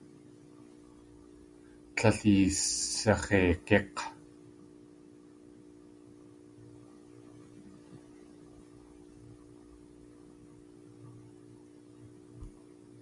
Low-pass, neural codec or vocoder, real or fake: 10.8 kHz; none; real